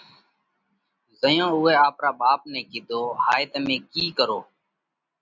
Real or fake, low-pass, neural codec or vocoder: real; 7.2 kHz; none